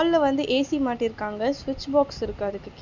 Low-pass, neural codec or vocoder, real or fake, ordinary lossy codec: 7.2 kHz; none; real; none